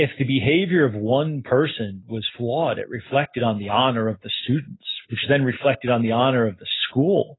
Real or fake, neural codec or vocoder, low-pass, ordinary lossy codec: real; none; 7.2 kHz; AAC, 16 kbps